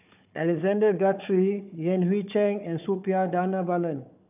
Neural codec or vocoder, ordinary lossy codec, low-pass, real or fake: codec, 16 kHz, 4 kbps, FunCodec, trained on Chinese and English, 50 frames a second; none; 3.6 kHz; fake